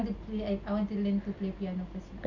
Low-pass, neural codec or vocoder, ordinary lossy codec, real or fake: 7.2 kHz; none; none; real